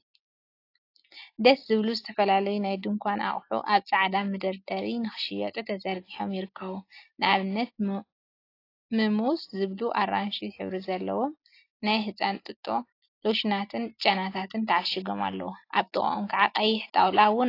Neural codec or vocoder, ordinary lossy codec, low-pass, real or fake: none; AAC, 32 kbps; 5.4 kHz; real